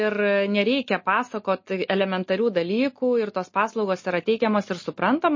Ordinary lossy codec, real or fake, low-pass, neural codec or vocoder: MP3, 32 kbps; real; 7.2 kHz; none